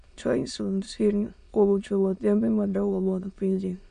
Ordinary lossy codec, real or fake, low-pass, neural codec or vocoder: none; fake; 9.9 kHz; autoencoder, 22.05 kHz, a latent of 192 numbers a frame, VITS, trained on many speakers